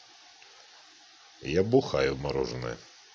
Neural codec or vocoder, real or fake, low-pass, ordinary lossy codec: none; real; none; none